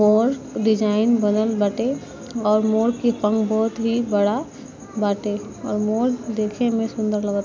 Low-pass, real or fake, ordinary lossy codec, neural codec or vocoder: none; real; none; none